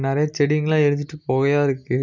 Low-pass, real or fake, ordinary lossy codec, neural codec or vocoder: 7.2 kHz; real; none; none